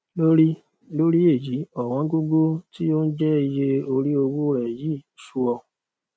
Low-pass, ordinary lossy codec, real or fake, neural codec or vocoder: none; none; real; none